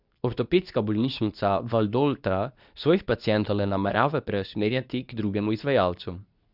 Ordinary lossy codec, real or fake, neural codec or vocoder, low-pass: none; fake; codec, 24 kHz, 0.9 kbps, WavTokenizer, medium speech release version 2; 5.4 kHz